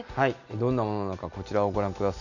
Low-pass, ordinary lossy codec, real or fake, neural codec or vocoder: 7.2 kHz; none; real; none